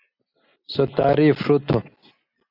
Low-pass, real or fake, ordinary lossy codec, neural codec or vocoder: 5.4 kHz; real; Opus, 64 kbps; none